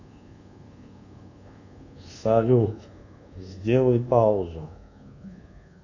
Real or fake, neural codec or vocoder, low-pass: fake; codec, 24 kHz, 1.2 kbps, DualCodec; 7.2 kHz